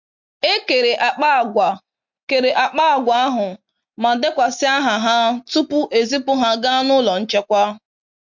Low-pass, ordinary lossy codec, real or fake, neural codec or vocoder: 7.2 kHz; MP3, 48 kbps; real; none